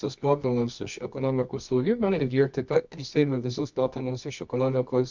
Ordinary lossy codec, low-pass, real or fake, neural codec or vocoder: MP3, 64 kbps; 7.2 kHz; fake; codec, 24 kHz, 0.9 kbps, WavTokenizer, medium music audio release